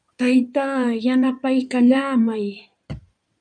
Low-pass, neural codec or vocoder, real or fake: 9.9 kHz; codec, 16 kHz in and 24 kHz out, 2.2 kbps, FireRedTTS-2 codec; fake